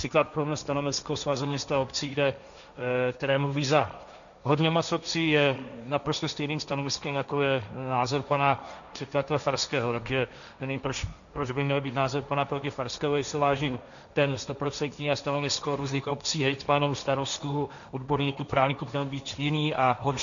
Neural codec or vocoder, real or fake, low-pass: codec, 16 kHz, 1.1 kbps, Voila-Tokenizer; fake; 7.2 kHz